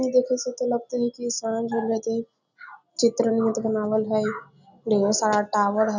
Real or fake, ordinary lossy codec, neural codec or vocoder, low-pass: real; none; none; 7.2 kHz